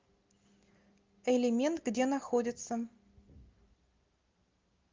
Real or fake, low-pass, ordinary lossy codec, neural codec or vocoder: real; 7.2 kHz; Opus, 32 kbps; none